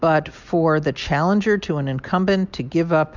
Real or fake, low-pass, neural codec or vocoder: real; 7.2 kHz; none